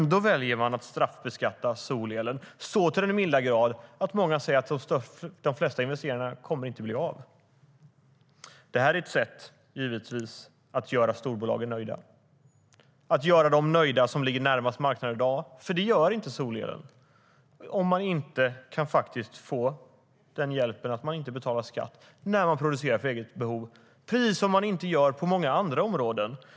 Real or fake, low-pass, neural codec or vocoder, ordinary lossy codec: real; none; none; none